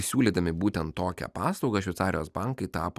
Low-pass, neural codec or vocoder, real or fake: 14.4 kHz; none; real